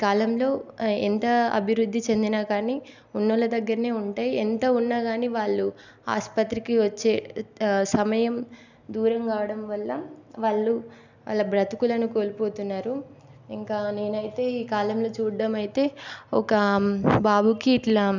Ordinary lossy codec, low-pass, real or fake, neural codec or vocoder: none; 7.2 kHz; real; none